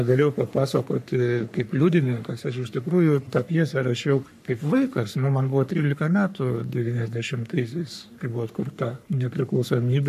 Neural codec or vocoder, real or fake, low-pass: codec, 44.1 kHz, 3.4 kbps, Pupu-Codec; fake; 14.4 kHz